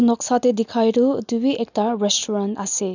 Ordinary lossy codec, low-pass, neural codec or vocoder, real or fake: none; 7.2 kHz; none; real